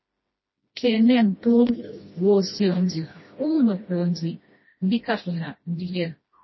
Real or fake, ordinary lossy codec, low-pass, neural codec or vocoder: fake; MP3, 24 kbps; 7.2 kHz; codec, 16 kHz, 1 kbps, FreqCodec, smaller model